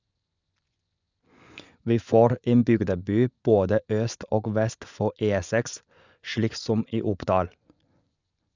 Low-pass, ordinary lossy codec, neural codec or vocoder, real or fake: 7.2 kHz; none; vocoder, 24 kHz, 100 mel bands, Vocos; fake